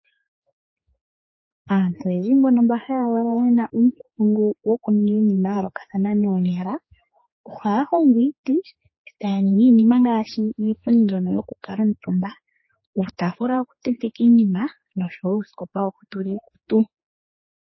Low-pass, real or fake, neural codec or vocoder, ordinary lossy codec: 7.2 kHz; fake; codec, 16 kHz, 4 kbps, X-Codec, HuBERT features, trained on general audio; MP3, 24 kbps